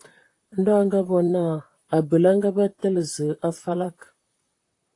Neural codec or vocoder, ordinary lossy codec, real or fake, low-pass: vocoder, 44.1 kHz, 128 mel bands, Pupu-Vocoder; AAC, 48 kbps; fake; 10.8 kHz